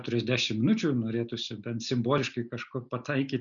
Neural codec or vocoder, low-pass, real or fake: none; 7.2 kHz; real